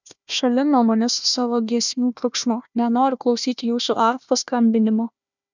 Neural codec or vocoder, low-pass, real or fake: codec, 16 kHz, 1 kbps, FunCodec, trained on Chinese and English, 50 frames a second; 7.2 kHz; fake